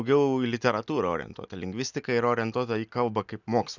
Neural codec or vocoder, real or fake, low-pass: none; real; 7.2 kHz